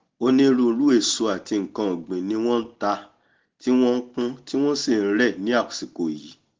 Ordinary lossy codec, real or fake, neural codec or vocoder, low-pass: Opus, 16 kbps; real; none; 7.2 kHz